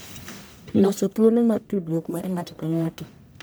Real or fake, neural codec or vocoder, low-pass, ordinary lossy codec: fake; codec, 44.1 kHz, 1.7 kbps, Pupu-Codec; none; none